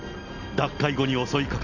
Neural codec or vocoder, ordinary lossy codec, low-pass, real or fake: none; none; 7.2 kHz; real